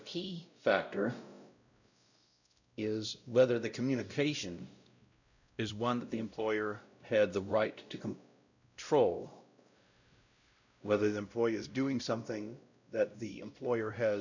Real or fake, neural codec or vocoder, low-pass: fake; codec, 16 kHz, 0.5 kbps, X-Codec, WavLM features, trained on Multilingual LibriSpeech; 7.2 kHz